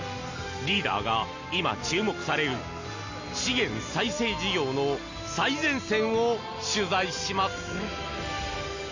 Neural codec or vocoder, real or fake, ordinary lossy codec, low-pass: none; real; Opus, 64 kbps; 7.2 kHz